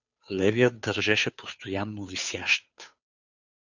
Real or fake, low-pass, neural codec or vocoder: fake; 7.2 kHz; codec, 16 kHz, 2 kbps, FunCodec, trained on Chinese and English, 25 frames a second